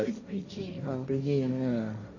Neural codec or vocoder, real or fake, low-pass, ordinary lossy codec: codec, 16 kHz, 1.1 kbps, Voila-Tokenizer; fake; 7.2 kHz; none